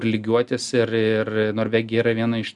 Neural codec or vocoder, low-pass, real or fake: none; 10.8 kHz; real